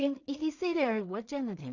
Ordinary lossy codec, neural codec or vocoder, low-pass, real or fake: none; codec, 16 kHz in and 24 kHz out, 0.4 kbps, LongCat-Audio-Codec, two codebook decoder; 7.2 kHz; fake